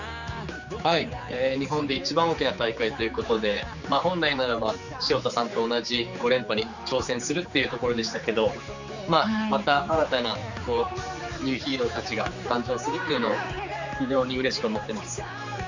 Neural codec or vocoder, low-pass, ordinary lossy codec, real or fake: codec, 16 kHz, 4 kbps, X-Codec, HuBERT features, trained on general audio; 7.2 kHz; none; fake